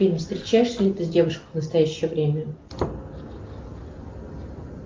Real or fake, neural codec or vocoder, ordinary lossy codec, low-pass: real; none; Opus, 24 kbps; 7.2 kHz